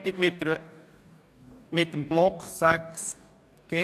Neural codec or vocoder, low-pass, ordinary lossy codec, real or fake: codec, 44.1 kHz, 2.6 kbps, DAC; 14.4 kHz; AAC, 96 kbps; fake